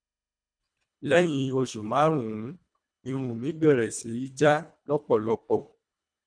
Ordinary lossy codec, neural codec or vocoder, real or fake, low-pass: none; codec, 24 kHz, 1.5 kbps, HILCodec; fake; 9.9 kHz